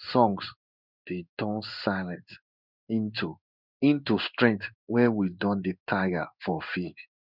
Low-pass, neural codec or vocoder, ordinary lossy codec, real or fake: 5.4 kHz; codec, 16 kHz in and 24 kHz out, 1 kbps, XY-Tokenizer; none; fake